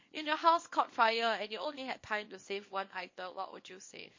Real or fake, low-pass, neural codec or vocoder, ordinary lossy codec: fake; 7.2 kHz; codec, 24 kHz, 0.9 kbps, WavTokenizer, small release; MP3, 32 kbps